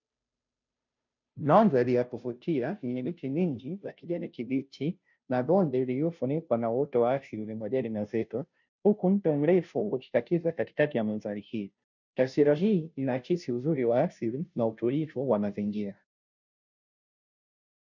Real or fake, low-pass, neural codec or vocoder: fake; 7.2 kHz; codec, 16 kHz, 0.5 kbps, FunCodec, trained on Chinese and English, 25 frames a second